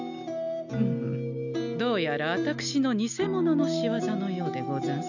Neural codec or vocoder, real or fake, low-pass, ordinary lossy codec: none; real; 7.2 kHz; none